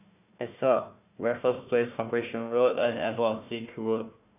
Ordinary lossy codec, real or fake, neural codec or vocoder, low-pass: none; fake; codec, 16 kHz, 1 kbps, FunCodec, trained on Chinese and English, 50 frames a second; 3.6 kHz